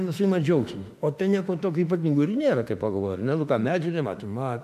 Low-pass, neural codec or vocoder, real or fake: 14.4 kHz; autoencoder, 48 kHz, 32 numbers a frame, DAC-VAE, trained on Japanese speech; fake